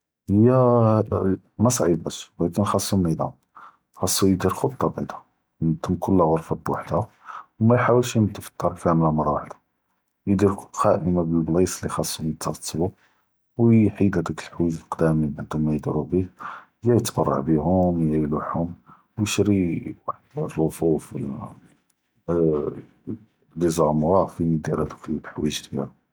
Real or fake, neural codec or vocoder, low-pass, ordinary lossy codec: real; none; none; none